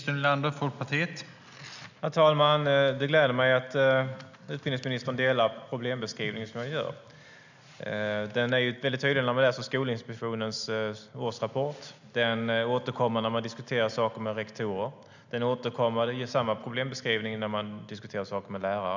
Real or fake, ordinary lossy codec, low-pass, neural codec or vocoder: real; none; 7.2 kHz; none